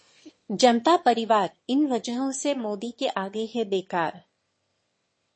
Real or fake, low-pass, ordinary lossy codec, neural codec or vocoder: fake; 9.9 kHz; MP3, 32 kbps; autoencoder, 22.05 kHz, a latent of 192 numbers a frame, VITS, trained on one speaker